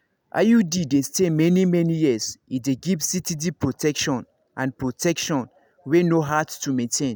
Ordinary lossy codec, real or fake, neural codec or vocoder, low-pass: none; real; none; none